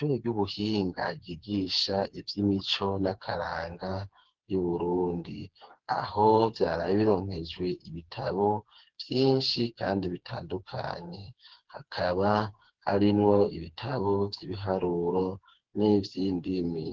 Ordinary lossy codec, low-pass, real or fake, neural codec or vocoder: Opus, 16 kbps; 7.2 kHz; fake; codec, 16 kHz, 4 kbps, FreqCodec, smaller model